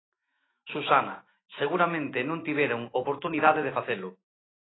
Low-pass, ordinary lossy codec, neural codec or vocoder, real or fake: 7.2 kHz; AAC, 16 kbps; autoencoder, 48 kHz, 128 numbers a frame, DAC-VAE, trained on Japanese speech; fake